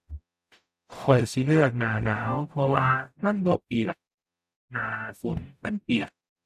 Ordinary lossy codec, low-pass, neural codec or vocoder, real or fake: none; 14.4 kHz; codec, 44.1 kHz, 0.9 kbps, DAC; fake